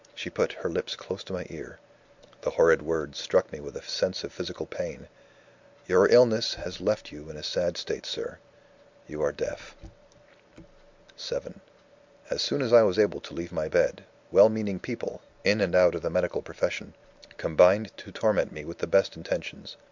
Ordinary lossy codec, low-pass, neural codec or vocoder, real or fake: MP3, 64 kbps; 7.2 kHz; none; real